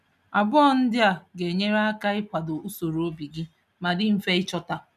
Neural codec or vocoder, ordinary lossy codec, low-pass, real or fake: none; none; 14.4 kHz; real